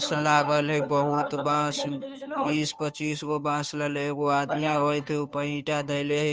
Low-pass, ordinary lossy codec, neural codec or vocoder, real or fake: none; none; codec, 16 kHz, 2 kbps, FunCodec, trained on Chinese and English, 25 frames a second; fake